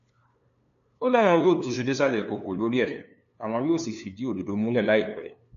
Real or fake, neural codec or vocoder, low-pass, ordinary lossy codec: fake; codec, 16 kHz, 2 kbps, FunCodec, trained on LibriTTS, 25 frames a second; 7.2 kHz; none